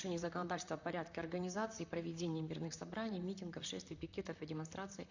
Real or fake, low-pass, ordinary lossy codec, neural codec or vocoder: fake; 7.2 kHz; none; vocoder, 44.1 kHz, 128 mel bands, Pupu-Vocoder